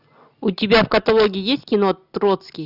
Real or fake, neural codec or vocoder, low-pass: real; none; 5.4 kHz